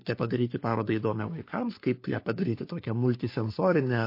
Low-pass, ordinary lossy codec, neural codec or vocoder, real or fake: 5.4 kHz; MP3, 32 kbps; codec, 44.1 kHz, 3.4 kbps, Pupu-Codec; fake